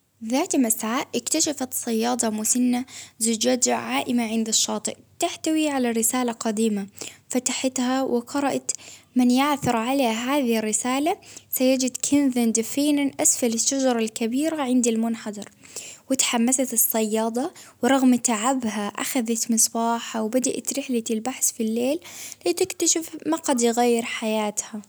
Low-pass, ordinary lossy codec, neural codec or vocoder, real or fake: none; none; none; real